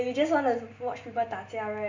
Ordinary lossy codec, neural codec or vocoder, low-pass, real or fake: MP3, 48 kbps; none; 7.2 kHz; real